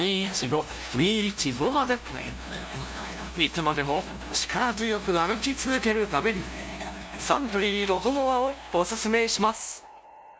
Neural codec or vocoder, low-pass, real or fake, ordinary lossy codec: codec, 16 kHz, 0.5 kbps, FunCodec, trained on LibriTTS, 25 frames a second; none; fake; none